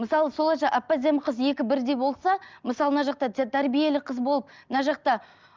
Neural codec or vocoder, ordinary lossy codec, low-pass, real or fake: none; Opus, 24 kbps; 7.2 kHz; real